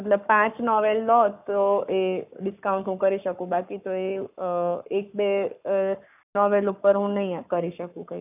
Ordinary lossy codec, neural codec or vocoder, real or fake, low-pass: none; codec, 44.1 kHz, 7.8 kbps, Pupu-Codec; fake; 3.6 kHz